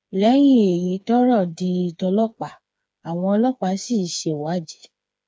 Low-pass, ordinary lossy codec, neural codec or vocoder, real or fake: none; none; codec, 16 kHz, 4 kbps, FreqCodec, smaller model; fake